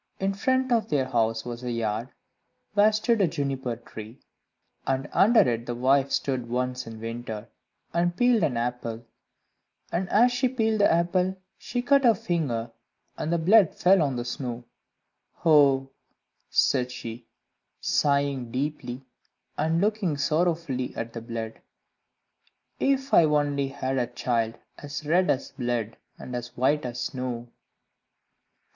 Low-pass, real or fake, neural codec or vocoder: 7.2 kHz; real; none